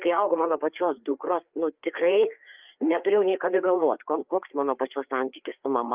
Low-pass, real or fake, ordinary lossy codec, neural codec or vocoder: 3.6 kHz; fake; Opus, 24 kbps; codec, 16 kHz, 4 kbps, FreqCodec, larger model